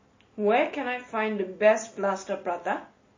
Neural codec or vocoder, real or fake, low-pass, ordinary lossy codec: none; real; 7.2 kHz; MP3, 32 kbps